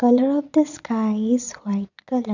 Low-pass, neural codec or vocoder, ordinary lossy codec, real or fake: 7.2 kHz; vocoder, 22.05 kHz, 80 mel bands, Vocos; none; fake